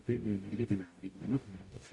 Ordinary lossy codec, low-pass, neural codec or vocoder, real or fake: AAC, 48 kbps; 10.8 kHz; codec, 44.1 kHz, 0.9 kbps, DAC; fake